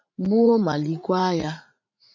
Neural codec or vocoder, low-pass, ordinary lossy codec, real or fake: vocoder, 44.1 kHz, 80 mel bands, Vocos; 7.2 kHz; MP3, 48 kbps; fake